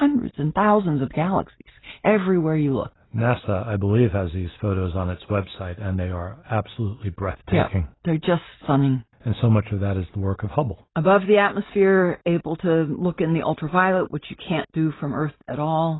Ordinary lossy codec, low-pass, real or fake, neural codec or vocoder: AAC, 16 kbps; 7.2 kHz; real; none